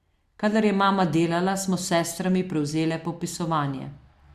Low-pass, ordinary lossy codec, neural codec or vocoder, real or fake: 14.4 kHz; Opus, 64 kbps; none; real